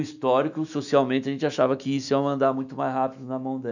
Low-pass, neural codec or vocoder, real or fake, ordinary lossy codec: 7.2 kHz; autoencoder, 48 kHz, 128 numbers a frame, DAC-VAE, trained on Japanese speech; fake; none